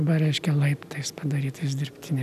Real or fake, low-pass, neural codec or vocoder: real; 14.4 kHz; none